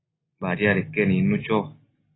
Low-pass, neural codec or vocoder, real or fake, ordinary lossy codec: 7.2 kHz; none; real; AAC, 16 kbps